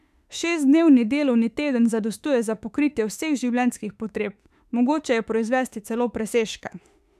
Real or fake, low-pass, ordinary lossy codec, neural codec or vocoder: fake; 14.4 kHz; none; autoencoder, 48 kHz, 32 numbers a frame, DAC-VAE, trained on Japanese speech